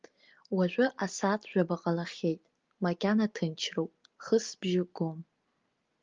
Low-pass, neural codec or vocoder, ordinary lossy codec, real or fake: 7.2 kHz; none; Opus, 32 kbps; real